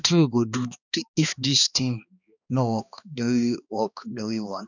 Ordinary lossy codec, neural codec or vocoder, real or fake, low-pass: none; codec, 16 kHz, 2 kbps, X-Codec, HuBERT features, trained on balanced general audio; fake; 7.2 kHz